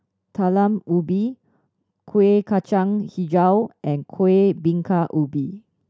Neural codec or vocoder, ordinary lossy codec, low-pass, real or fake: none; none; none; real